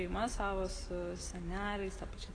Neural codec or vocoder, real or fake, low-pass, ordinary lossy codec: none; real; 9.9 kHz; AAC, 32 kbps